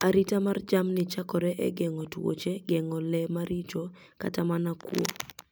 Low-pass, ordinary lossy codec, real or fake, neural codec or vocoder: none; none; real; none